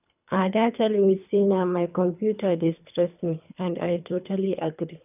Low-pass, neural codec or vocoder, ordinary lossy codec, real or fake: 3.6 kHz; codec, 24 kHz, 3 kbps, HILCodec; none; fake